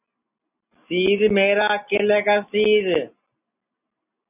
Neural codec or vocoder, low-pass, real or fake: none; 3.6 kHz; real